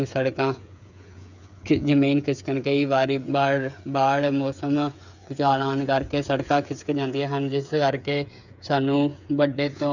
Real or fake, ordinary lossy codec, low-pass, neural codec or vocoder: fake; none; 7.2 kHz; codec, 16 kHz, 8 kbps, FreqCodec, smaller model